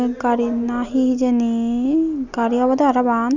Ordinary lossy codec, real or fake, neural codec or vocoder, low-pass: none; real; none; 7.2 kHz